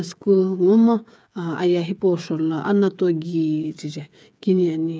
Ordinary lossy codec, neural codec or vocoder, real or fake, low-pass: none; codec, 16 kHz, 8 kbps, FreqCodec, smaller model; fake; none